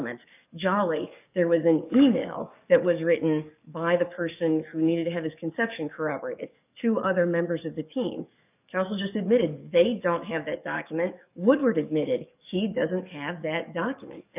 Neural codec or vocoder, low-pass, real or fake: codec, 44.1 kHz, 7.8 kbps, DAC; 3.6 kHz; fake